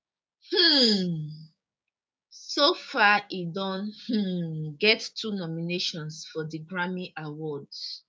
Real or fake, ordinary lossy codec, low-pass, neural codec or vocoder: fake; none; none; codec, 16 kHz, 6 kbps, DAC